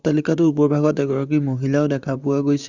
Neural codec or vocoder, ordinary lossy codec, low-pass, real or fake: vocoder, 44.1 kHz, 128 mel bands, Pupu-Vocoder; Opus, 64 kbps; 7.2 kHz; fake